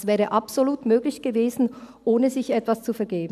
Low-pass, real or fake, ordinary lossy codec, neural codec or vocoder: 14.4 kHz; real; none; none